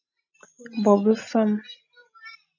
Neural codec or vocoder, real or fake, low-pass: none; real; 7.2 kHz